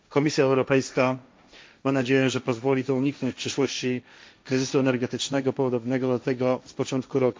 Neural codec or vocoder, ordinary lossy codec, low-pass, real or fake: codec, 16 kHz, 1.1 kbps, Voila-Tokenizer; none; none; fake